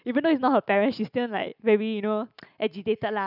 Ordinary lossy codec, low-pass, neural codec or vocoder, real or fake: none; 5.4 kHz; none; real